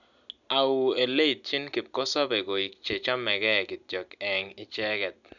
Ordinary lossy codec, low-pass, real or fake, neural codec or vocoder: none; 7.2 kHz; real; none